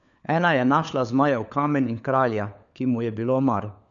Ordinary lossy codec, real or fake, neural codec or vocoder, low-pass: none; fake; codec, 16 kHz, 4 kbps, FunCodec, trained on LibriTTS, 50 frames a second; 7.2 kHz